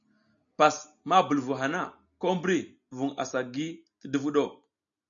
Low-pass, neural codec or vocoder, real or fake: 7.2 kHz; none; real